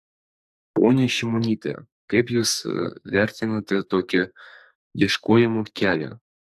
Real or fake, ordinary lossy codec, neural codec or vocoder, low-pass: fake; AAC, 96 kbps; codec, 44.1 kHz, 2.6 kbps, SNAC; 14.4 kHz